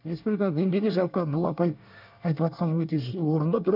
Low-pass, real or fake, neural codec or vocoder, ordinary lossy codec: 5.4 kHz; fake; codec, 24 kHz, 1 kbps, SNAC; none